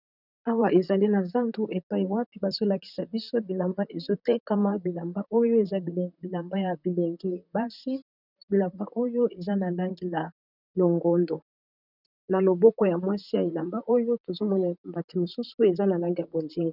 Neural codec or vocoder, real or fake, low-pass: vocoder, 44.1 kHz, 128 mel bands, Pupu-Vocoder; fake; 5.4 kHz